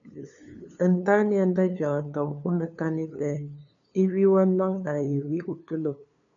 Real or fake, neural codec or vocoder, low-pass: fake; codec, 16 kHz, 2 kbps, FunCodec, trained on LibriTTS, 25 frames a second; 7.2 kHz